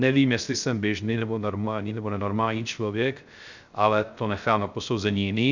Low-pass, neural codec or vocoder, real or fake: 7.2 kHz; codec, 16 kHz, 0.3 kbps, FocalCodec; fake